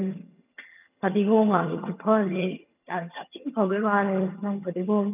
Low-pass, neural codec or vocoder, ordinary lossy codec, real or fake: 3.6 kHz; vocoder, 22.05 kHz, 80 mel bands, HiFi-GAN; none; fake